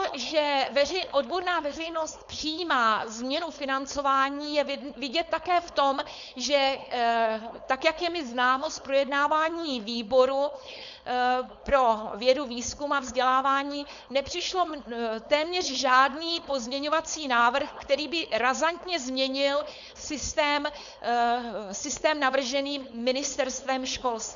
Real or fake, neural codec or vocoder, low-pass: fake; codec, 16 kHz, 4.8 kbps, FACodec; 7.2 kHz